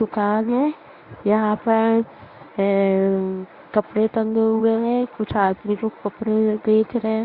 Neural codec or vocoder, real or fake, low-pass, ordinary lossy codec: codec, 24 kHz, 0.9 kbps, WavTokenizer, medium speech release version 1; fake; 5.4 kHz; Opus, 64 kbps